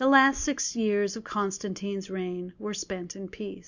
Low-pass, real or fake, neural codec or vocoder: 7.2 kHz; real; none